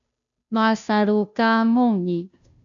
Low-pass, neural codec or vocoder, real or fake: 7.2 kHz; codec, 16 kHz, 0.5 kbps, FunCodec, trained on Chinese and English, 25 frames a second; fake